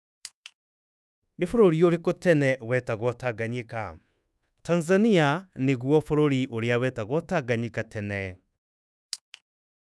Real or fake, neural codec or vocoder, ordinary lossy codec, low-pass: fake; codec, 24 kHz, 1.2 kbps, DualCodec; none; none